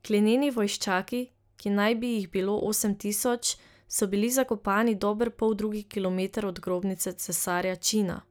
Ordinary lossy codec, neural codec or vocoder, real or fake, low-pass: none; none; real; none